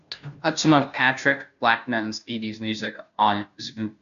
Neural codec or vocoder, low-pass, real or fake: codec, 16 kHz, 0.5 kbps, FunCodec, trained on Chinese and English, 25 frames a second; 7.2 kHz; fake